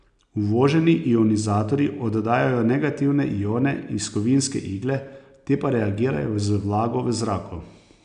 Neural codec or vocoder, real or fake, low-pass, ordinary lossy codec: none; real; 9.9 kHz; none